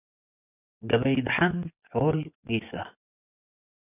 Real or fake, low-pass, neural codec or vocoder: fake; 3.6 kHz; vocoder, 24 kHz, 100 mel bands, Vocos